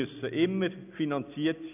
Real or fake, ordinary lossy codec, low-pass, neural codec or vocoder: real; none; 3.6 kHz; none